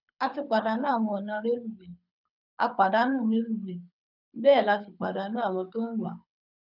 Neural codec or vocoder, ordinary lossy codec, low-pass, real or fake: codec, 24 kHz, 6 kbps, HILCodec; none; 5.4 kHz; fake